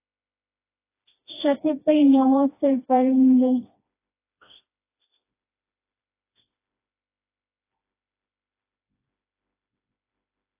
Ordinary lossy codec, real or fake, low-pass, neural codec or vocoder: AAC, 24 kbps; fake; 3.6 kHz; codec, 16 kHz, 1 kbps, FreqCodec, smaller model